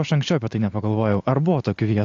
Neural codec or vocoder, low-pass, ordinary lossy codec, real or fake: none; 7.2 kHz; AAC, 48 kbps; real